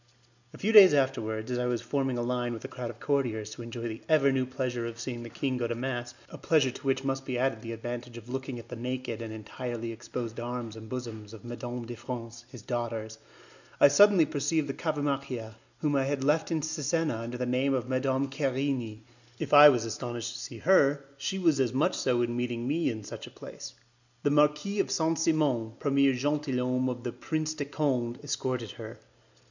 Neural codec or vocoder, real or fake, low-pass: none; real; 7.2 kHz